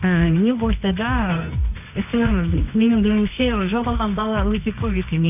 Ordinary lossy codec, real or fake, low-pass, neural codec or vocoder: none; fake; 3.6 kHz; codec, 24 kHz, 0.9 kbps, WavTokenizer, medium music audio release